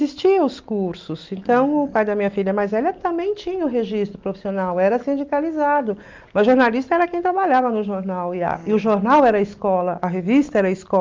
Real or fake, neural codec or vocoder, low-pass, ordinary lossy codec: real; none; 7.2 kHz; Opus, 32 kbps